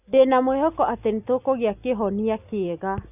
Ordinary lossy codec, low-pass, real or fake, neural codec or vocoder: none; 3.6 kHz; real; none